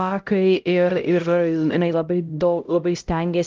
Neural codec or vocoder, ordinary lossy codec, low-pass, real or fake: codec, 16 kHz, 0.5 kbps, X-Codec, HuBERT features, trained on LibriSpeech; Opus, 32 kbps; 7.2 kHz; fake